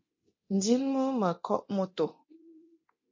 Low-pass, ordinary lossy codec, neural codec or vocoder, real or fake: 7.2 kHz; MP3, 32 kbps; codec, 24 kHz, 0.9 kbps, DualCodec; fake